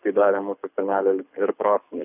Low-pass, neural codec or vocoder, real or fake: 3.6 kHz; codec, 24 kHz, 6 kbps, HILCodec; fake